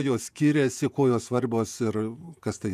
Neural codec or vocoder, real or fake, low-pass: vocoder, 48 kHz, 128 mel bands, Vocos; fake; 14.4 kHz